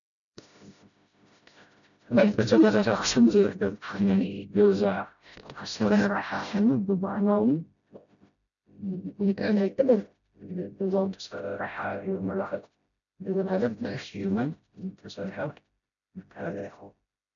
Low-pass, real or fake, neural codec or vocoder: 7.2 kHz; fake; codec, 16 kHz, 0.5 kbps, FreqCodec, smaller model